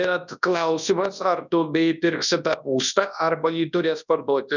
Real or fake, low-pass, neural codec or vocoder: fake; 7.2 kHz; codec, 24 kHz, 0.9 kbps, WavTokenizer, large speech release